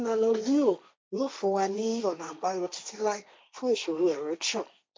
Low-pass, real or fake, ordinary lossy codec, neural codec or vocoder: none; fake; none; codec, 16 kHz, 1.1 kbps, Voila-Tokenizer